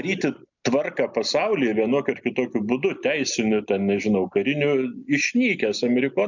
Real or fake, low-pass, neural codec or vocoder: real; 7.2 kHz; none